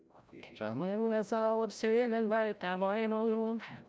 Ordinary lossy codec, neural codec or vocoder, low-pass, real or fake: none; codec, 16 kHz, 0.5 kbps, FreqCodec, larger model; none; fake